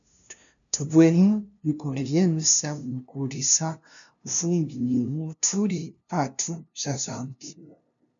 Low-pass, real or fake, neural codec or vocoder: 7.2 kHz; fake; codec, 16 kHz, 0.5 kbps, FunCodec, trained on LibriTTS, 25 frames a second